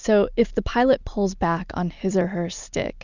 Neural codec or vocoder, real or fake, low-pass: none; real; 7.2 kHz